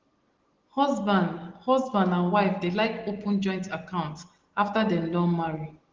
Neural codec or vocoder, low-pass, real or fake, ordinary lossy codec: none; 7.2 kHz; real; Opus, 16 kbps